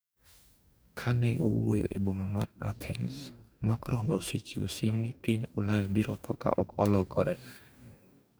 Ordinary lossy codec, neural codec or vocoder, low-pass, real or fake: none; codec, 44.1 kHz, 2.6 kbps, DAC; none; fake